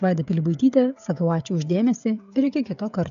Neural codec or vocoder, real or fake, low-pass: codec, 16 kHz, 16 kbps, FreqCodec, smaller model; fake; 7.2 kHz